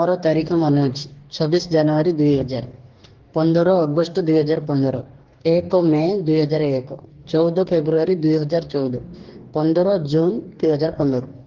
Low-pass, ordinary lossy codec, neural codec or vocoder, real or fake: 7.2 kHz; Opus, 16 kbps; codec, 44.1 kHz, 2.6 kbps, DAC; fake